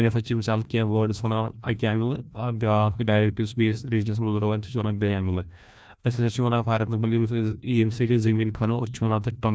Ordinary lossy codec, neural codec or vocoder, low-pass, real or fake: none; codec, 16 kHz, 1 kbps, FreqCodec, larger model; none; fake